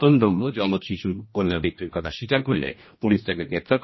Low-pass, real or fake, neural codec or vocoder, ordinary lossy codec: 7.2 kHz; fake; codec, 16 kHz, 1 kbps, X-Codec, HuBERT features, trained on general audio; MP3, 24 kbps